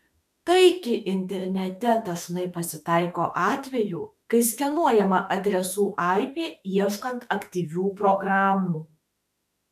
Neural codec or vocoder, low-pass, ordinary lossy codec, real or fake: autoencoder, 48 kHz, 32 numbers a frame, DAC-VAE, trained on Japanese speech; 14.4 kHz; AAC, 96 kbps; fake